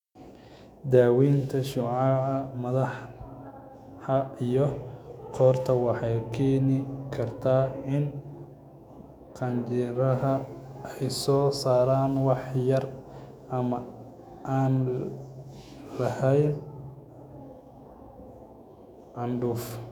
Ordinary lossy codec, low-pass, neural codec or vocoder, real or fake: none; 19.8 kHz; autoencoder, 48 kHz, 128 numbers a frame, DAC-VAE, trained on Japanese speech; fake